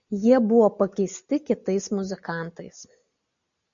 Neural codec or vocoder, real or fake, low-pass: none; real; 7.2 kHz